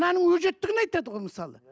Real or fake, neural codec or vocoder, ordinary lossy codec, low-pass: real; none; none; none